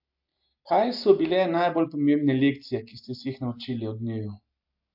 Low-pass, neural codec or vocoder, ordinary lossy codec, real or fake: 5.4 kHz; none; AAC, 48 kbps; real